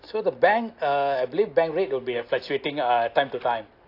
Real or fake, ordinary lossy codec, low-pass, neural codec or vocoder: real; AAC, 32 kbps; 5.4 kHz; none